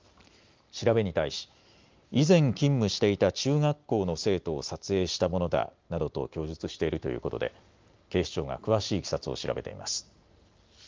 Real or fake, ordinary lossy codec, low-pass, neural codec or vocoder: real; Opus, 24 kbps; 7.2 kHz; none